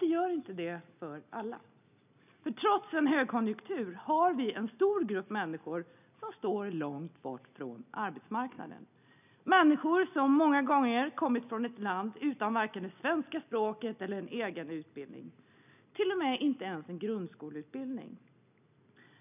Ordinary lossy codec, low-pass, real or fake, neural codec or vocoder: none; 3.6 kHz; real; none